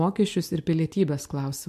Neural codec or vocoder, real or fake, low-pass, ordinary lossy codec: none; real; 14.4 kHz; MP3, 64 kbps